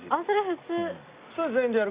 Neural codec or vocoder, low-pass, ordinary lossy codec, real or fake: none; 3.6 kHz; Opus, 64 kbps; real